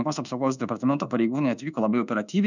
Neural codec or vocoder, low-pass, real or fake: codec, 24 kHz, 1.2 kbps, DualCodec; 7.2 kHz; fake